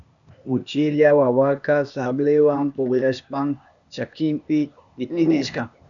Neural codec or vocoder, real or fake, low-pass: codec, 16 kHz, 0.8 kbps, ZipCodec; fake; 7.2 kHz